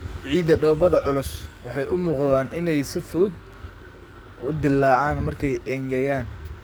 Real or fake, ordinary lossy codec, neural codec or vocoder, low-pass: fake; none; codec, 44.1 kHz, 2.6 kbps, SNAC; none